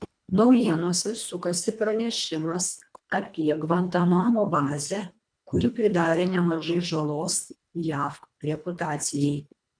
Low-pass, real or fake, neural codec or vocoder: 9.9 kHz; fake; codec, 24 kHz, 1.5 kbps, HILCodec